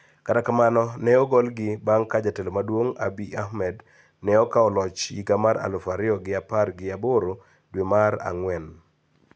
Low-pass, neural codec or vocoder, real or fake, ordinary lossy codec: none; none; real; none